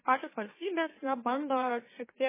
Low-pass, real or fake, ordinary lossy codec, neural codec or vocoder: 3.6 kHz; fake; MP3, 16 kbps; autoencoder, 44.1 kHz, a latent of 192 numbers a frame, MeloTTS